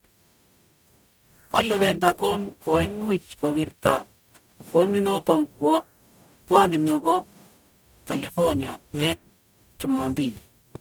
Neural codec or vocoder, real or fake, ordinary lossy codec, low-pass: codec, 44.1 kHz, 0.9 kbps, DAC; fake; none; none